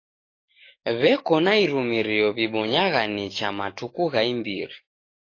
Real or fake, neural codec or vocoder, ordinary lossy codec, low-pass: fake; codec, 44.1 kHz, 7.8 kbps, DAC; AAC, 32 kbps; 7.2 kHz